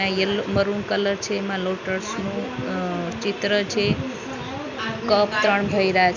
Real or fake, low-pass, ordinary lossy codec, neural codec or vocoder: real; 7.2 kHz; none; none